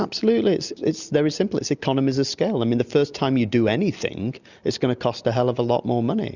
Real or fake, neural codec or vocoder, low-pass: real; none; 7.2 kHz